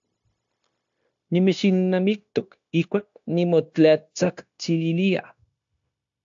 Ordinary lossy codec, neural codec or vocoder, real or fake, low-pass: AAC, 64 kbps; codec, 16 kHz, 0.9 kbps, LongCat-Audio-Codec; fake; 7.2 kHz